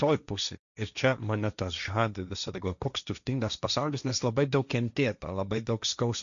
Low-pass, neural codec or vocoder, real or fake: 7.2 kHz; codec, 16 kHz, 1.1 kbps, Voila-Tokenizer; fake